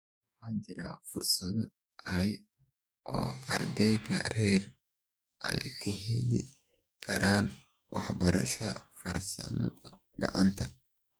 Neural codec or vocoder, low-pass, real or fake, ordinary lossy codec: codec, 44.1 kHz, 2.6 kbps, DAC; none; fake; none